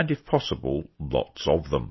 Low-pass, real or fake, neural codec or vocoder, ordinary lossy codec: 7.2 kHz; real; none; MP3, 24 kbps